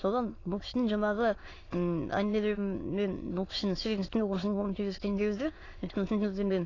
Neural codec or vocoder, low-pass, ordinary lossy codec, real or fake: autoencoder, 22.05 kHz, a latent of 192 numbers a frame, VITS, trained on many speakers; 7.2 kHz; AAC, 32 kbps; fake